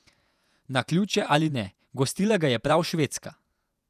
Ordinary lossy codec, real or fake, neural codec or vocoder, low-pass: none; fake; vocoder, 48 kHz, 128 mel bands, Vocos; 14.4 kHz